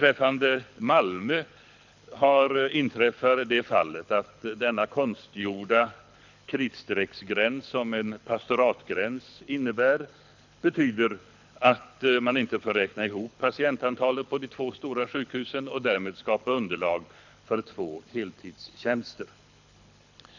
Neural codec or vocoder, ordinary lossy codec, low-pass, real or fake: codec, 24 kHz, 6 kbps, HILCodec; none; 7.2 kHz; fake